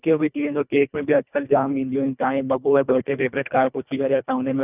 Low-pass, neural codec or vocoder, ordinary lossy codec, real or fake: 3.6 kHz; codec, 24 kHz, 1.5 kbps, HILCodec; none; fake